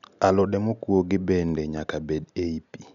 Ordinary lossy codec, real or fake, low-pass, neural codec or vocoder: none; real; 7.2 kHz; none